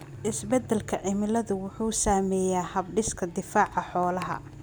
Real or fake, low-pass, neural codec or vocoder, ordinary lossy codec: real; none; none; none